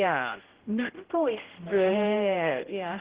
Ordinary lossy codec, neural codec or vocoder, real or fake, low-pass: Opus, 16 kbps; codec, 16 kHz, 0.5 kbps, X-Codec, HuBERT features, trained on general audio; fake; 3.6 kHz